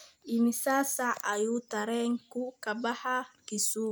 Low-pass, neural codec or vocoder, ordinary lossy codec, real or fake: none; none; none; real